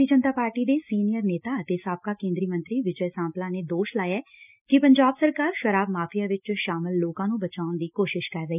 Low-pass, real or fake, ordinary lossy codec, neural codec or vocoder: 3.6 kHz; real; none; none